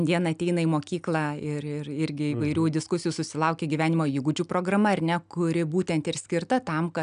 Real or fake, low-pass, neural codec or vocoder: real; 9.9 kHz; none